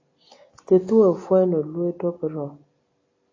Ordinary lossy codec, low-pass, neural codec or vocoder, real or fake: AAC, 32 kbps; 7.2 kHz; none; real